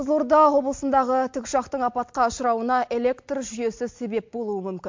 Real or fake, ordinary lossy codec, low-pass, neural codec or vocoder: real; MP3, 48 kbps; 7.2 kHz; none